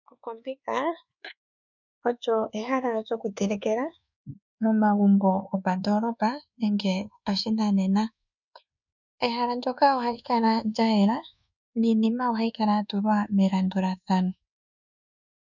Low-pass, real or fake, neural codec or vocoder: 7.2 kHz; fake; codec, 24 kHz, 1.2 kbps, DualCodec